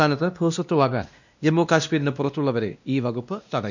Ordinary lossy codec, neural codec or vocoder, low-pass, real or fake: none; codec, 16 kHz, 1 kbps, X-Codec, WavLM features, trained on Multilingual LibriSpeech; 7.2 kHz; fake